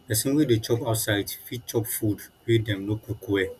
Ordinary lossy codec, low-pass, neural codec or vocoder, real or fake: none; 14.4 kHz; none; real